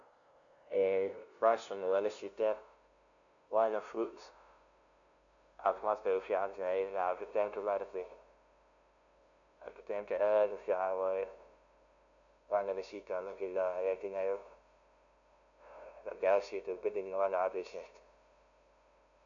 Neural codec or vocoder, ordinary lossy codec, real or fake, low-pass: codec, 16 kHz, 0.5 kbps, FunCodec, trained on LibriTTS, 25 frames a second; none; fake; 7.2 kHz